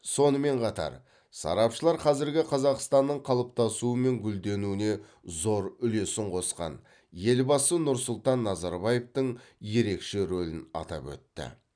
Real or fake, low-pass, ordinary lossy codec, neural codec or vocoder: real; 9.9 kHz; none; none